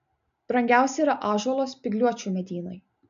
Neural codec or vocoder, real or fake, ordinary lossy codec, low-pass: none; real; MP3, 64 kbps; 7.2 kHz